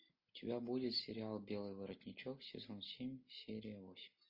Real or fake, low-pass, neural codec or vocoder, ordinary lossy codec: real; 5.4 kHz; none; MP3, 32 kbps